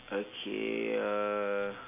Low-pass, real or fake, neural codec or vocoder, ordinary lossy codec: 3.6 kHz; real; none; AAC, 32 kbps